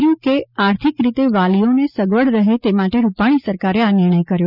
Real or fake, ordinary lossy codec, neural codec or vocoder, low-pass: real; none; none; 5.4 kHz